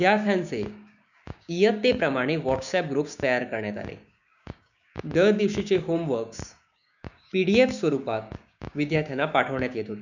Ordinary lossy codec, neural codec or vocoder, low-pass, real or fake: none; codec, 16 kHz, 6 kbps, DAC; 7.2 kHz; fake